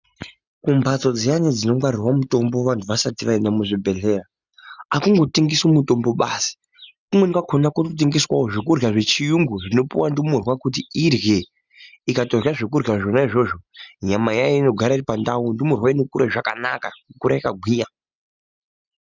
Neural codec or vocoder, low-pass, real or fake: none; 7.2 kHz; real